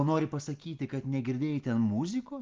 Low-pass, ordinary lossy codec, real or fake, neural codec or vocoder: 7.2 kHz; Opus, 32 kbps; real; none